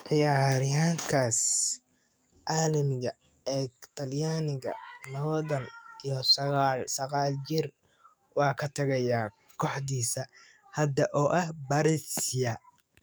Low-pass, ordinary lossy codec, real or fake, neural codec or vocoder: none; none; fake; codec, 44.1 kHz, 7.8 kbps, DAC